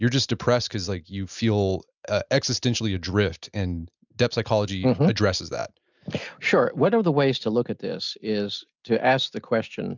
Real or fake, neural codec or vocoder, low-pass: real; none; 7.2 kHz